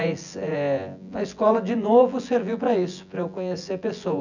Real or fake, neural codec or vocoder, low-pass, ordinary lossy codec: fake; vocoder, 24 kHz, 100 mel bands, Vocos; 7.2 kHz; none